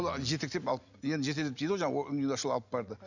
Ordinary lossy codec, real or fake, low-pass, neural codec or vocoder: none; real; 7.2 kHz; none